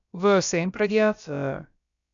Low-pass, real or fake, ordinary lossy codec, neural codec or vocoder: 7.2 kHz; fake; MP3, 96 kbps; codec, 16 kHz, about 1 kbps, DyCAST, with the encoder's durations